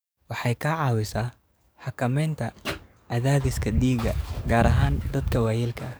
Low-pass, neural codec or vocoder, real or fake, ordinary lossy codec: none; codec, 44.1 kHz, 7.8 kbps, DAC; fake; none